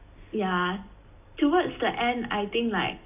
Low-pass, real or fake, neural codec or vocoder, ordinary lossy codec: 3.6 kHz; real; none; AAC, 32 kbps